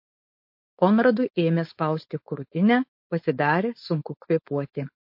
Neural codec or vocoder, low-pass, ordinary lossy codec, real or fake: codec, 16 kHz, 4.8 kbps, FACodec; 5.4 kHz; MP3, 32 kbps; fake